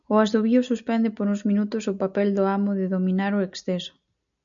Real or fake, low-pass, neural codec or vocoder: real; 7.2 kHz; none